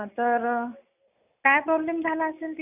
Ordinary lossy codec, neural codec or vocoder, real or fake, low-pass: none; none; real; 3.6 kHz